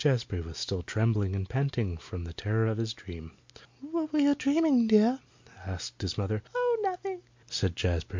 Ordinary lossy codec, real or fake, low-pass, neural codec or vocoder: MP3, 48 kbps; real; 7.2 kHz; none